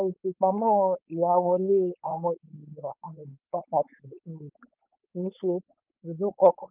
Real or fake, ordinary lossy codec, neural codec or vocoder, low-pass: fake; Opus, 32 kbps; codec, 16 kHz, 4.8 kbps, FACodec; 3.6 kHz